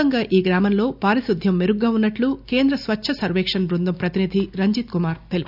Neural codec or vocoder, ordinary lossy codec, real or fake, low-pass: none; none; real; 5.4 kHz